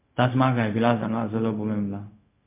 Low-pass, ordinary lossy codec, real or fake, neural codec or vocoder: 3.6 kHz; MP3, 24 kbps; fake; codec, 16 kHz, 0.4 kbps, LongCat-Audio-Codec